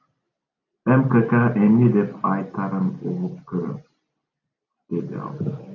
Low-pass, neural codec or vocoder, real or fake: 7.2 kHz; none; real